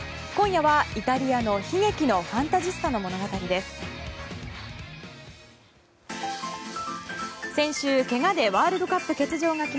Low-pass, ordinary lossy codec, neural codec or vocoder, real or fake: none; none; none; real